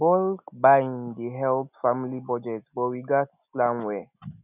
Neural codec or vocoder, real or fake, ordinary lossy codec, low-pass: none; real; none; 3.6 kHz